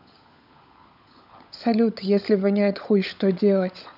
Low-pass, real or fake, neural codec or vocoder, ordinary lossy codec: 5.4 kHz; fake; codec, 16 kHz, 16 kbps, FunCodec, trained on LibriTTS, 50 frames a second; none